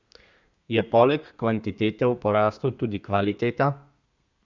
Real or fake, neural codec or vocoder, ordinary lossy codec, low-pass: fake; codec, 32 kHz, 1.9 kbps, SNAC; Opus, 64 kbps; 7.2 kHz